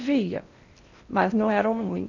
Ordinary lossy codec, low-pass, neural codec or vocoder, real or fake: none; 7.2 kHz; codec, 16 kHz in and 24 kHz out, 0.8 kbps, FocalCodec, streaming, 65536 codes; fake